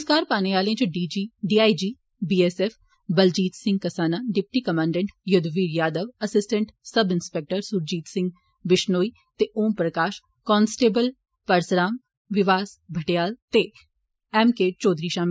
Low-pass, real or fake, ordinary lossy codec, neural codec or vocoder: none; real; none; none